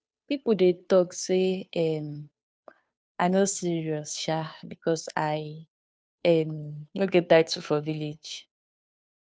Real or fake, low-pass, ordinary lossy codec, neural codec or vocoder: fake; none; none; codec, 16 kHz, 2 kbps, FunCodec, trained on Chinese and English, 25 frames a second